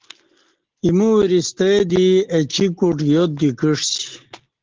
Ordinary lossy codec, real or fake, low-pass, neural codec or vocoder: Opus, 16 kbps; real; 7.2 kHz; none